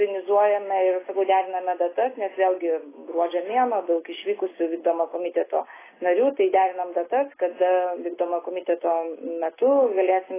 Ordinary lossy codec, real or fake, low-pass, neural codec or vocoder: AAC, 16 kbps; real; 3.6 kHz; none